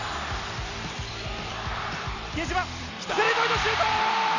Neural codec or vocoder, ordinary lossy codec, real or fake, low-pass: vocoder, 44.1 kHz, 128 mel bands every 256 samples, BigVGAN v2; none; fake; 7.2 kHz